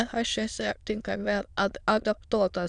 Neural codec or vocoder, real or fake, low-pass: autoencoder, 22.05 kHz, a latent of 192 numbers a frame, VITS, trained on many speakers; fake; 9.9 kHz